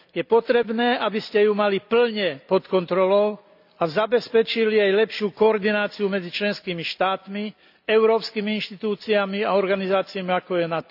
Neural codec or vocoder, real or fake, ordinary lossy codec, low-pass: none; real; none; 5.4 kHz